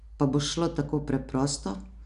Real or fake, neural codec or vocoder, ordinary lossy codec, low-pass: real; none; none; 10.8 kHz